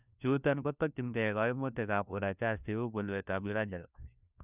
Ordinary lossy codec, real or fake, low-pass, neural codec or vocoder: none; fake; 3.6 kHz; codec, 16 kHz, 1 kbps, FunCodec, trained on LibriTTS, 50 frames a second